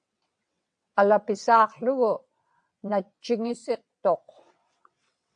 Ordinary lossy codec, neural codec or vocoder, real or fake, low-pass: AAC, 64 kbps; vocoder, 22.05 kHz, 80 mel bands, WaveNeXt; fake; 9.9 kHz